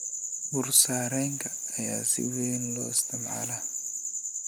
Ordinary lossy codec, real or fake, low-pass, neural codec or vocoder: none; real; none; none